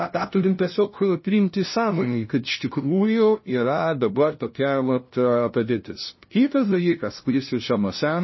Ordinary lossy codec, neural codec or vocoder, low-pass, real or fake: MP3, 24 kbps; codec, 16 kHz, 0.5 kbps, FunCodec, trained on LibriTTS, 25 frames a second; 7.2 kHz; fake